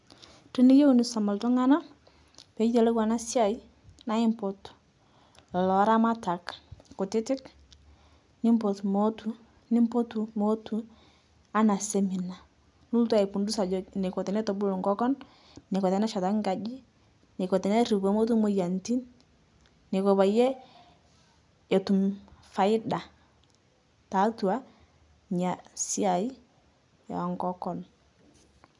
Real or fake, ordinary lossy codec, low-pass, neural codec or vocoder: real; none; 10.8 kHz; none